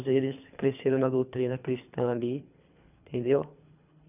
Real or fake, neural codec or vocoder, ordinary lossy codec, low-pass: fake; codec, 24 kHz, 3 kbps, HILCodec; none; 3.6 kHz